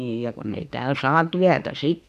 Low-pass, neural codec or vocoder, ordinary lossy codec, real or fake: 14.4 kHz; autoencoder, 48 kHz, 32 numbers a frame, DAC-VAE, trained on Japanese speech; none; fake